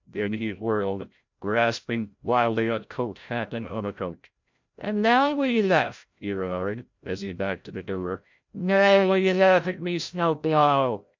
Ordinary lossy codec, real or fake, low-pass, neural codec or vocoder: MP3, 64 kbps; fake; 7.2 kHz; codec, 16 kHz, 0.5 kbps, FreqCodec, larger model